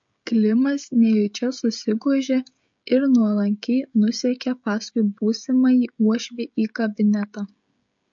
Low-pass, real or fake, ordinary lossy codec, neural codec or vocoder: 7.2 kHz; fake; MP3, 48 kbps; codec, 16 kHz, 16 kbps, FreqCodec, smaller model